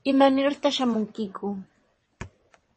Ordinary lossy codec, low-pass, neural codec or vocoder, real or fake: MP3, 32 kbps; 9.9 kHz; vocoder, 22.05 kHz, 80 mel bands, WaveNeXt; fake